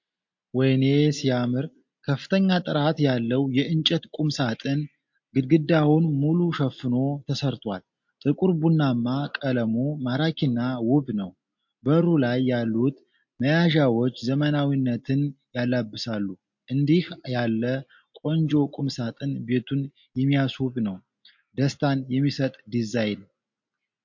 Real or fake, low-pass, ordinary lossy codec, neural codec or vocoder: real; 7.2 kHz; MP3, 48 kbps; none